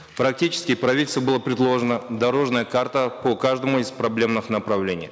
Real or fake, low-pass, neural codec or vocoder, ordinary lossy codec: real; none; none; none